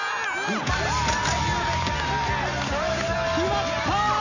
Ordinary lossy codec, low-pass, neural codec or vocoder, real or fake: none; 7.2 kHz; none; real